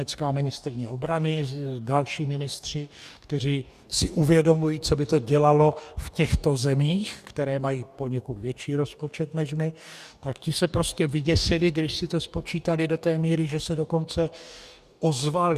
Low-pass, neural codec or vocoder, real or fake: 14.4 kHz; codec, 44.1 kHz, 2.6 kbps, DAC; fake